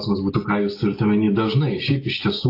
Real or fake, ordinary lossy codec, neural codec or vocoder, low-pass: real; AAC, 32 kbps; none; 5.4 kHz